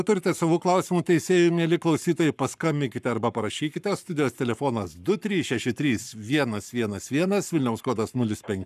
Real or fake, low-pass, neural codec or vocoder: fake; 14.4 kHz; codec, 44.1 kHz, 7.8 kbps, Pupu-Codec